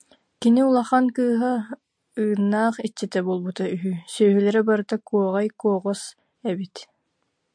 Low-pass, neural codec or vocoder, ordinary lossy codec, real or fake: 9.9 kHz; none; MP3, 64 kbps; real